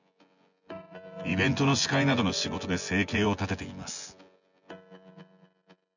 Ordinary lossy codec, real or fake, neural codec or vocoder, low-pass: none; fake; vocoder, 24 kHz, 100 mel bands, Vocos; 7.2 kHz